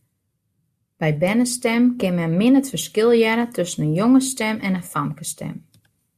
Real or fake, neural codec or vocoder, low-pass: real; none; 14.4 kHz